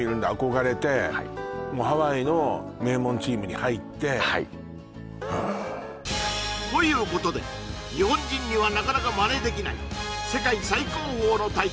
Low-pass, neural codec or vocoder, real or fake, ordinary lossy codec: none; none; real; none